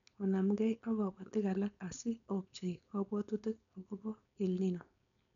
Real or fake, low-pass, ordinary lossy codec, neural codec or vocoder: fake; 7.2 kHz; none; codec, 16 kHz, 4.8 kbps, FACodec